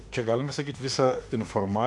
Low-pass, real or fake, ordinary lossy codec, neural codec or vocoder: 10.8 kHz; fake; AAC, 64 kbps; autoencoder, 48 kHz, 32 numbers a frame, DAC-VAE, trained on Japanese speech